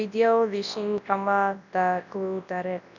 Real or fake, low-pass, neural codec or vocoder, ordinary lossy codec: fake; 7.2 kHz; codec, 24 kHz, 0.9 kbps, WavTokenizer, large speech release; none